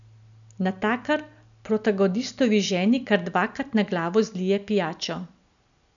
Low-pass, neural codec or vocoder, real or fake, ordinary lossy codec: 7.2 kHz; none; real; none